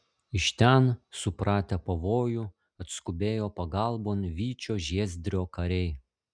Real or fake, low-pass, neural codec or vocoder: real; 9.9 kHz; none